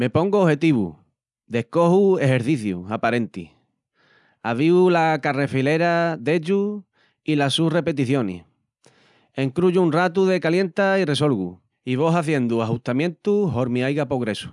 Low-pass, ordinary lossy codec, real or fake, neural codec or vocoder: 10.8 kHz; none; real; none